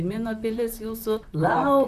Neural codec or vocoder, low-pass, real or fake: vocoder, 44.1 kHz, 128 mel bands, Pupu-Vocoder; 14.4 kHz; fake